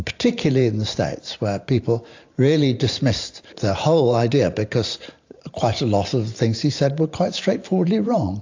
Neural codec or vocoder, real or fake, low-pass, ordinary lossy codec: vocoder, 44.1 kHz, 128 mel bands every 512 samples, BigVGAN v2; fake; 7.2 kHz; AAC, 48 kbps